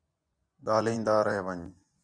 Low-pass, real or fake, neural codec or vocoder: 9.9 kHz; fake; vocoder, 24 kHz, 100 mel bands, Vocos